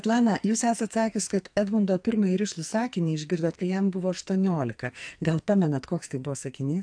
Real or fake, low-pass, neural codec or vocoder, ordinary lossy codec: fake; 9.9 kHz; codec, 44.1 kHz, 2.6 kbps, SNAC; AAC, 64 kbps